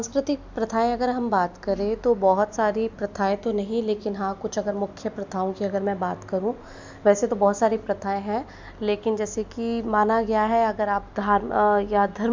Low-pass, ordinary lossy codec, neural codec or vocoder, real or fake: 7.2 kHz; MP3, 64 kbps; none; real